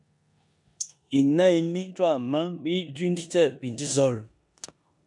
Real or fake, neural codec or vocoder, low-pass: fake; codec, 16 kHz in and 24 kHz out, 0.9 kbps, LongCat-Audio-Codec, four codebook decoder; 10.8 kHz